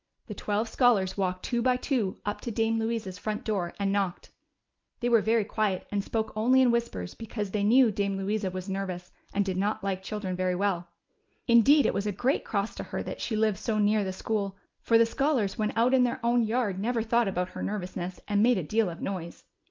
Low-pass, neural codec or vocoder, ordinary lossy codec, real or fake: 7.2 kHz; none; Opus, 32 kbps; real